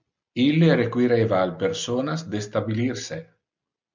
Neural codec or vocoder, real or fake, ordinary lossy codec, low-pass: none; real; MP3, 64 kbps; 7.2 kHz